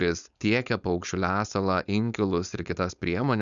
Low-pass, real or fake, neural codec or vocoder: 7.2 kHz; fake; codec, 16 kHz, 4.8 kbps, FACodec